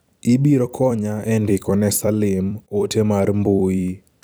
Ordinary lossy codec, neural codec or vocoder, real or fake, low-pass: none; vocoder, 44.1 kHz, 128 mel bands every 256 samples, BigVGAN v2; fake; none